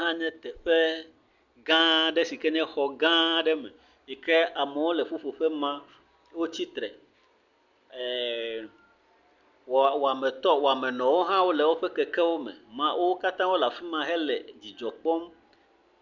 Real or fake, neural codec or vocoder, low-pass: real; none; 7.2 kHz